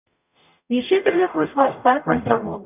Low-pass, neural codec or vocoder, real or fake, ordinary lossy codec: 3.6 kHz; codec, 44.1 kHz, 0.9 kbps, DAC; fake; none